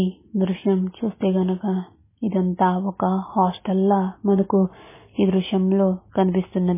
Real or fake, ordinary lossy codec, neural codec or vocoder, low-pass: real; MP3, 16 kbps; none; 3.6 kHz